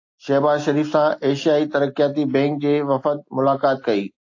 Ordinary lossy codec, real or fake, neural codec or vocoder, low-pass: AAC, 48 kbps; real; none; 7.2 kHz